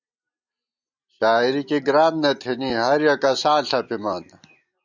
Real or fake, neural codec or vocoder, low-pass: real; none; 7.2 kHz